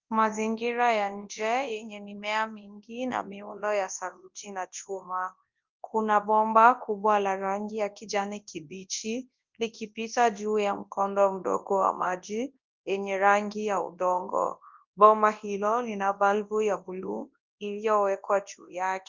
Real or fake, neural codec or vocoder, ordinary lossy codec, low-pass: fake; codec, 24 kHz, 0.9 kbps, WavTokenizer, large speech release; Opus, 24 kbps; 7.2 kHz